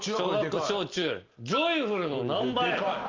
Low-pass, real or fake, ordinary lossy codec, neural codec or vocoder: 7.2 kHz; real; Opus, 32 kbps; none